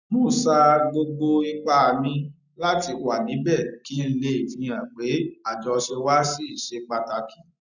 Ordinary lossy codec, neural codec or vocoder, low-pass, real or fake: none; none; 7.2 kHz; real